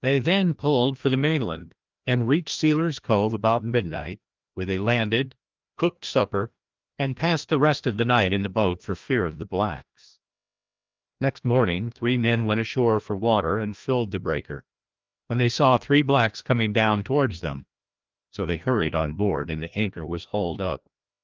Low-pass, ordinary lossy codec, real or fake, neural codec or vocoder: 7.2 kHz; Opus, 24 kbps; fake; codec, 16 kHz, 1 kbps, FreqCodec, larger model